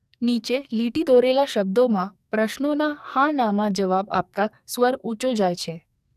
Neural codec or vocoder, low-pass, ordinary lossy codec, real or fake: codec, 44.1 kHz, 2.6 kbps, SNAC; 14.4 kHz; none; fake